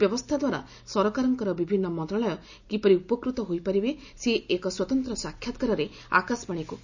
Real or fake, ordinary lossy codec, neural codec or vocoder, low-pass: real; none; none; 7.2 kHz